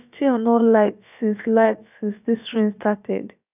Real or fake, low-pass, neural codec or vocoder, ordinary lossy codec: fake; 3.6 kHz; codec, 16 kHz, about 1 kbps, DyCAST, with the encoder's durations; none